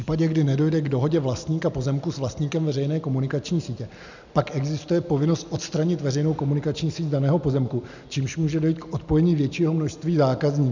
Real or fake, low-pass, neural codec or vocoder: real; 7.2 kHz; none